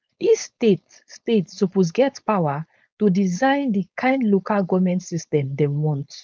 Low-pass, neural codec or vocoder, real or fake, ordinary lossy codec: none; codec, 16 kHz, 4.8 kbps, FACodec; fake; none